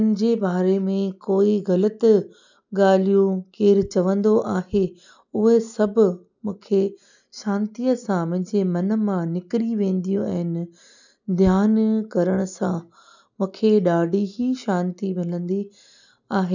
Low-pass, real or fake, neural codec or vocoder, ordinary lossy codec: 7.2 kHz; real; none; none